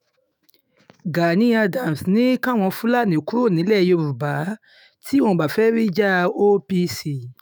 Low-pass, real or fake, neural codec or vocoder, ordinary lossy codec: none; fake; autoencoder, 48 kHz, 128 numbers a frame, DAC-VAE, trained on Japanese speech; none